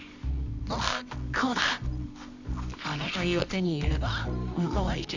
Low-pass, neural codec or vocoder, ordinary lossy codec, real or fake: 7.2 kHz; codec, 24 kHz, 0.9 kbps, WavTokenizer, medium music audio release; none; fake